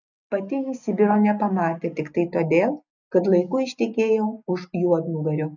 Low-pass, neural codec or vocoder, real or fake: 7.2 kHz; none; real